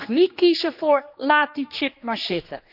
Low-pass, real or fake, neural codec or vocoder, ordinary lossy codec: 5.4 kHz; fake; codec, 16 kHz, 2 kbps, X-Codec, HuBERT features, trained on general audio; none